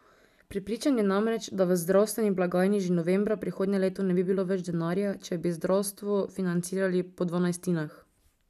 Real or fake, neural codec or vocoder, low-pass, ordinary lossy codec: real; none; 14.4 kHz; none